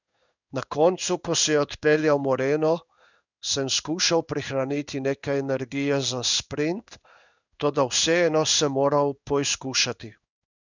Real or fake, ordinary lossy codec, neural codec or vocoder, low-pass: fake; none; codec, 16 kHz in and 24 kHz out, 1 kbps, XY-Tokenizer; 7.2 kHz